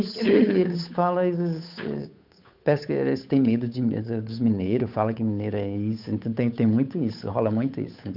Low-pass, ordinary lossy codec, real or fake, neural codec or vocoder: 5.4 kHz; none; fake; codec, 16 kHz, 8 kbps, FunCodec, trained on Chinese and English, 25 frames a second